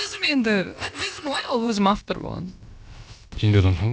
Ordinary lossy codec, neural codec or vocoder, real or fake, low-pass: none; codec, 16 kHz, about 1 kbps, DyCAST, with the encoder's durations; fake; none